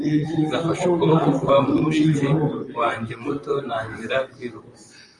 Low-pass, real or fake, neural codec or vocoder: 10.8 kHz; fake; vocoder, 44.1 kHz, 128 mel bands, Pupu-Vocoder